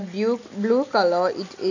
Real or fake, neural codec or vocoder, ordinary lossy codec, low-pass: real; none; none; 7.2 kHz